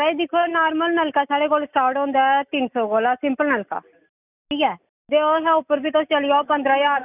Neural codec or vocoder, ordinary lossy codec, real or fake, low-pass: none; none; real; 3.6 kHz